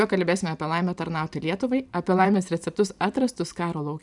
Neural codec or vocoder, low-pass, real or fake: vocoder, 44.1 kHz, 128 mel bands every 512 samples, BigVGAN v2; 10.8 kHz; fake